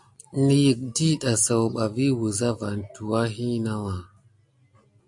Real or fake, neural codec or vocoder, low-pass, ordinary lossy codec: real; none; 10.8 kHz; AAC, 64 kbps